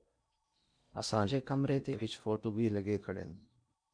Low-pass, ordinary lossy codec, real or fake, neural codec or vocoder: 9.9 kHz; MP3, 64 kbps; fake; codec, 16 kHz in and 24 kHz out, 0.8 kbps, FocalCodec, streaming, 65536 codes